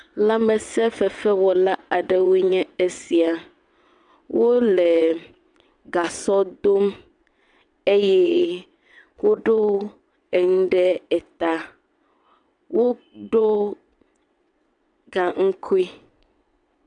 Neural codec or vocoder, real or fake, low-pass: vocoder, 22.05 kHz, 80 mel bands, WaveNeXt; fake; 9.9 kHz